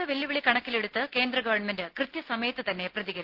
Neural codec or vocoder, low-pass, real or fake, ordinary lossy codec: none; 5.4 kHz; real; Opus, 16 kbps